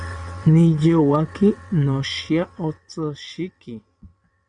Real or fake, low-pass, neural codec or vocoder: fake; 9.9 kHz; vocoder, 22.05 kHz, 80 mel bands, WaveNeXt